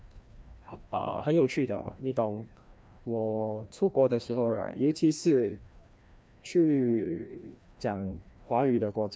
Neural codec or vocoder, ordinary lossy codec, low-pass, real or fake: codec, 16 kHz, 1 kbps, FreqCodec, larger model; none; none; fake